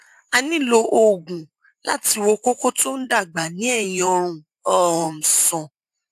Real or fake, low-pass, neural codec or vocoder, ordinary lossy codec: fake; 14.4 kHz; vocoder, 44.1 kHz, 128 mel bands every 512 samples, BigVGAN v2; AAC, 96 kbps